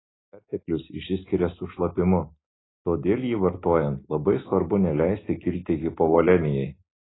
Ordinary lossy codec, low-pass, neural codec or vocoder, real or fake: AAC, 16 kbps; 7.2 kHz; none; real